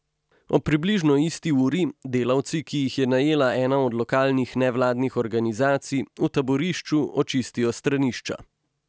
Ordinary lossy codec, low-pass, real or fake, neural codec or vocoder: none; none; real; none